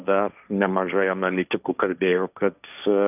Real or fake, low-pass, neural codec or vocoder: fake; 3.6 kHz; codec, 16 kHz, 1.1 kbps, Voila-Tokenizer